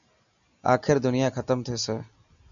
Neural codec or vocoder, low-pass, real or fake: none; 7.2 kHz; real